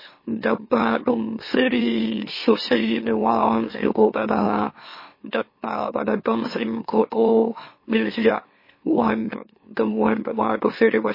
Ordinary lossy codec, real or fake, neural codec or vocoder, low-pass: MP3, 24 kbps; fake; autoencoder, 44.1 kHz, a latent of 192 numbers a frame, MeloTTS; 5.4 kHz